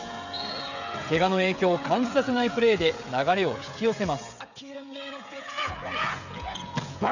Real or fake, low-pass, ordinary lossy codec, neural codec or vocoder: fake; 7.2 kHz; none; codec, 16 kHz, 16 kbps, FreqCodec, smaller model